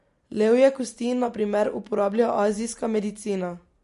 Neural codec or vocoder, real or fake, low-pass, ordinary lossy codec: none; real; 14.4 kHz; MP3, 48 kbps